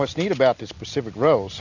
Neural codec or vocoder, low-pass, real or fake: none; 7.2 kHz; real